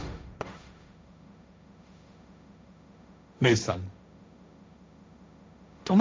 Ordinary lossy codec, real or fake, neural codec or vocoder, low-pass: none; fake; codec, 16 kHz, 1.1 kbps, Voila-Tokenizer; none